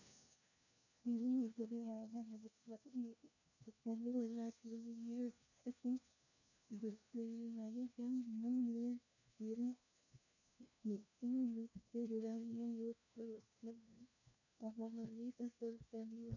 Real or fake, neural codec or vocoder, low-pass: fake; codec, 16 kHz, 1 kbps, FunCodec, trained on LibriTTS, 50 frames a second; 7.2 kHz